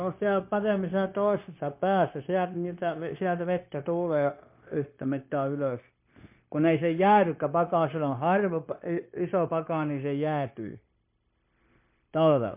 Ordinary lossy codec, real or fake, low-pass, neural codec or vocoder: MP3, 32 kbps; fake; 3.6 kHz; codec, 16 kHz, 0.9 kbps, LongCat-Audio-Codec